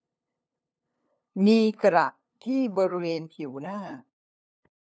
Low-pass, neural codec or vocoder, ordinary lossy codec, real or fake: none; codec, 16 kHz, 2 kbps, FunCodec, trained on LibriTTS, 25 frames a second; none; fake